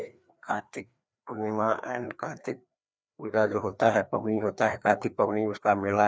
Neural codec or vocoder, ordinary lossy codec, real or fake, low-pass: codec, 16 kHz, 2 kbps, FreqCodec, larger model; none; fake; none